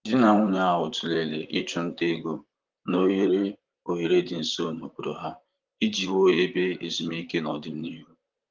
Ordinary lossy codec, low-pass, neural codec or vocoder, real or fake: Opus, 32 kbps; 7.2 kHz; vocoder, 44.1 kHz, 128 mel bands, Pupu-Vocoder; fake